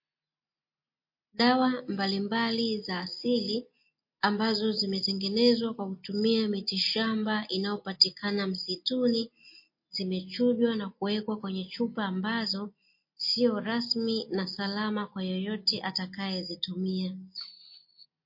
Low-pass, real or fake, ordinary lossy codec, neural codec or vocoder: 5.4 kHz; real; MP3, 32 kbps; none